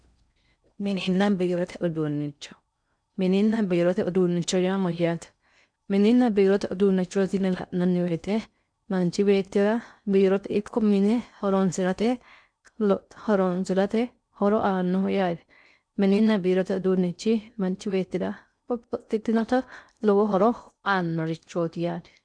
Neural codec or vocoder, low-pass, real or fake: codec, 16 kHz in and 24 kHz out, 0.6 kbps, FocalCodec, streaming, 4096 codes; 9.9 kHz; fake